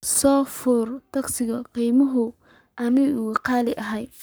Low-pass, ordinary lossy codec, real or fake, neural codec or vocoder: none; none; fake; codec, 44.1 kHz, 7.8 kbps, Pupu-Codec